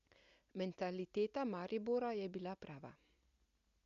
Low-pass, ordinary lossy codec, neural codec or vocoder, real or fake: 7.2 kHz; Opus, 64 kbps; none; real